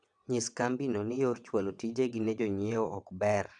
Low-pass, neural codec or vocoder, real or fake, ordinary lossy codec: 9.9 kHz; vocoder, 22.05 kHz, 80 mel bands, WaveNeXt; fake; none